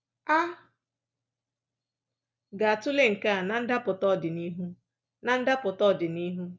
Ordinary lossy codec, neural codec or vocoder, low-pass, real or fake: none; none; none; real